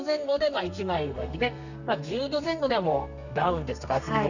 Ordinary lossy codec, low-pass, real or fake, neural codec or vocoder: none; 7.2 kHz; fake; codec, 32 kHz, 1.9 kbps, SNAC